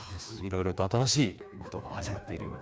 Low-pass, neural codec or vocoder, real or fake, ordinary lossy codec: none; codec, 16 kHz, 2 kbps, FreqCodec, larger model; fake; none